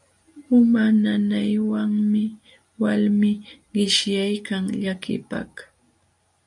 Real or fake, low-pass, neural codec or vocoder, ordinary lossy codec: real; 10.8 kHz; none; MP3, 96 kbps